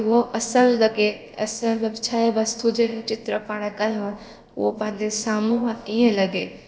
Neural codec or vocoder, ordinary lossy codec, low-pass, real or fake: codec, 16 kHz, about 1 kbps, DyCAST, with the encoder's durations; none; none; fake